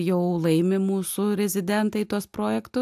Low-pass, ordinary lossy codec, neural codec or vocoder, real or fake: 14.4 kHz; AAC, 96 kbps; none; real